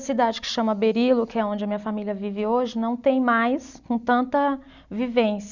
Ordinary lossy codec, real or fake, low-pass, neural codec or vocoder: none; real; 7.2 kHz; none